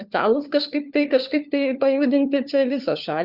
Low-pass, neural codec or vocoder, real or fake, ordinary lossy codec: 5.4 kHz; codec, 16 kHz, 4 kbps, FunCodec, trained on LibriTTS, 50 frames a second; fake; Opus, 64 kbps